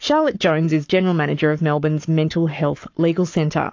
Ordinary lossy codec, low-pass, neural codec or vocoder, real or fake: AAC, 48 kbps; 7.2 kHz; codec, 44.1 kHz, 7.8 kbps, Pupu-Codec; fake